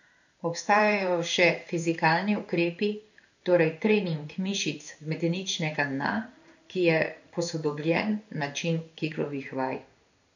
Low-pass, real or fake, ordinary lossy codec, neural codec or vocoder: 7.2 kHz; fake; none; codec, 16 kHz in and 24 kHz out, 1 kbps, XY-Tokenizer